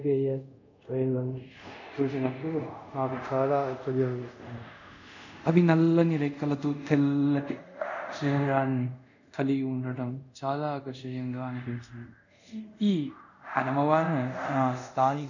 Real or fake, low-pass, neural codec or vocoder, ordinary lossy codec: fake; 7.2 kHz; codec, 24 kHz, 0.5 kbps, DualCodec; none